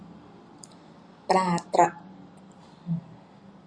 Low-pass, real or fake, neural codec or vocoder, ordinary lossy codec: 9.9 kHz; real; none; Opus, 64 kbps